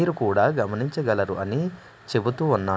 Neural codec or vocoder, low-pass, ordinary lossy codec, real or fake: none; none; none; real